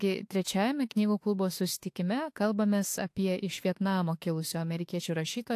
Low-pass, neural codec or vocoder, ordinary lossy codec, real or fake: 14.4 kHz; autoencoder, 48 kHz, 32 numbers a frame, DAC-VAE, trained on Japanese speech; AAC, 64 kbps; fake